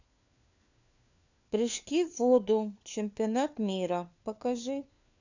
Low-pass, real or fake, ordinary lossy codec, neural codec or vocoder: 7.2 kHz; fake; none; codec, 16 kHz, 4 kbps, FunCodec, trained on LibriTTS, 50 frames a second